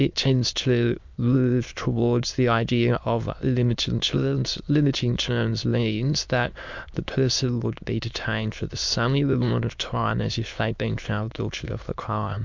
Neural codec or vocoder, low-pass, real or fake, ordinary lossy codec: autoencoder, 22.05 kHz, a latent of 192 numbers a frame, VITS, trained on many speakers; 7.2 kHz; fake; MP3, 64 kbps